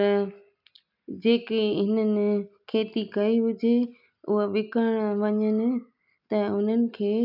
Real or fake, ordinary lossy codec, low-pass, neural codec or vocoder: real; none; 5.4 kHz; none